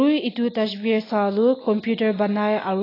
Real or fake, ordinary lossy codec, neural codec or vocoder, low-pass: real; AAC, 24 kbps; none; 5.4 kHz